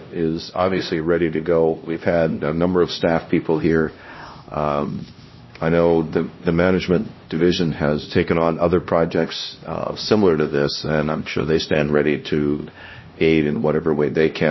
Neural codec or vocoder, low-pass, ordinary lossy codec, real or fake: codec, 16 kHz, 1 kbps, X-Codec, HuBERT features, trained on LibriSpeech; 7.2 kHz; MP3, 24 kbps; fake